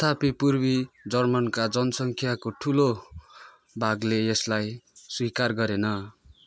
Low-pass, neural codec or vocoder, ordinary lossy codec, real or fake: none; none; none; real